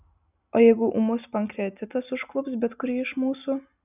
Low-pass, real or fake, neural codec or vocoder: 3.6 kHz; real; none